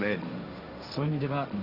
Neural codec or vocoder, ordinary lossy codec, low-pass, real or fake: codec, 16 kHz, 1.1 kbps, Voila-Tokenizer; Opus, 64 kbps; 5.4 kHz; fake